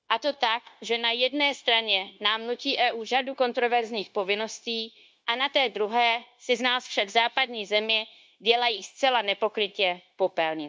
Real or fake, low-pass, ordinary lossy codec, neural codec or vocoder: fake; none; none; codec, 16 kHz, 0.9 kbps, LongCat-Audio-Codec